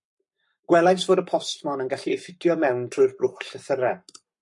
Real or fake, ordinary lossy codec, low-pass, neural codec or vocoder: fake; MP3, 48 kbps; 10.8 kHz; codec, 44.1 kHz, 7.8 kbps, Pupu-Codec